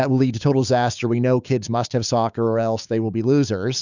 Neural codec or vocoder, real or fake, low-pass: codec, 16 kHz, 6 kbps, DAC; fake; 7.2 kHz